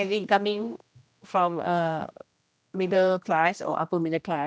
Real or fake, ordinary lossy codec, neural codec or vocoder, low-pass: fake; none; codec, 16 kHz, 1 kbps, X-Codec, HuBERT features, trained on general audio; none